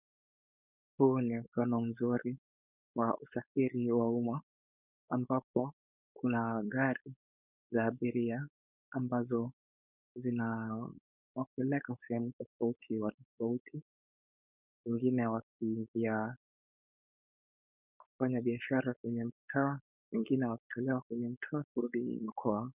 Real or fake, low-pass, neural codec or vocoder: fake; 3.6 kHz; codec, 16 kHz, 4.8 kbps, FACodec